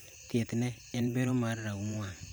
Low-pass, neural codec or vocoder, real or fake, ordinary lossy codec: none; vocoder, 44.1 kHz, 128 mel bands every 512 samples, BigVGAN v2; fake; none